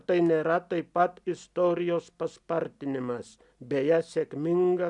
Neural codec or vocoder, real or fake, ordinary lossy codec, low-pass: none; real; MP3, 96 kbps; 10.8 kHz